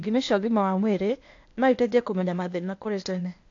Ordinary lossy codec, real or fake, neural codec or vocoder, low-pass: AAC, 48 kbps; fake; codec, 16 kHz, 0.8 kbps, ZipCodec; 7.2 kHz